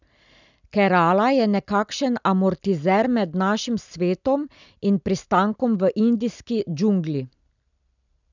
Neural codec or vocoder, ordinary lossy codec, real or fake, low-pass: none; none; real; 7.2 kHz